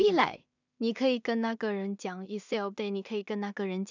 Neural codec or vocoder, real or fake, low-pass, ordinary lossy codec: codec, 16 kHz in and 24 kHz out, 0.4 kbps, LongCat-Audio-Codec, two codebook decoder; fake; 7.2 kHz; none